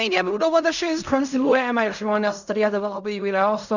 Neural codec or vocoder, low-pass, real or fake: codec, 16 kHz in and 24 kHz out, 0.4 kbps, LongCat-Audio-Codec, fine tuned four codebook decoder; 7.2 kHz; fake